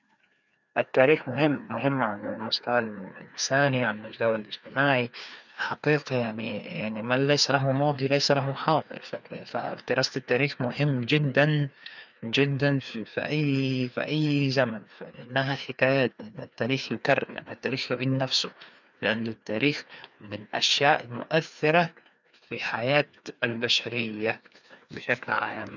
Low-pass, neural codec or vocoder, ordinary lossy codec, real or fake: 7.2 kHz; codec, 16 kHz, 2 kbps, FreqCodec, larger model; none; fake